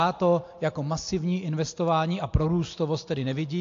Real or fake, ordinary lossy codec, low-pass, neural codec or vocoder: real; AAC, 48 kbps; 7.2 kHz; none